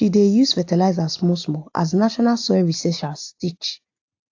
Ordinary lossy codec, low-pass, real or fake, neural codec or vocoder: AAC, 48 kbps; 7.2 kHz; real; none